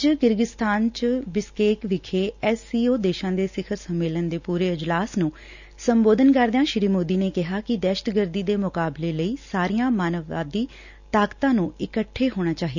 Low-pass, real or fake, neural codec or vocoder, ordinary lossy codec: 7.2 kHz; real; none; none